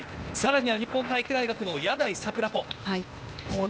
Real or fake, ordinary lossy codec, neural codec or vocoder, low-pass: fake; none; codec, 16 kHz, 0.8 kbps, ZipCodec; none